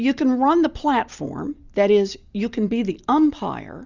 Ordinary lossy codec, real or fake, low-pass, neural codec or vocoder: Opus, 64 kbps; real; 7.2 kHz; none